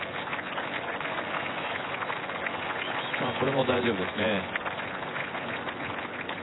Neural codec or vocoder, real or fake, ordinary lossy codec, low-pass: vocoder, 24 kHz, 100 mel bands, Vocos; fake; AAC, 16 kbps; 7.2 kHz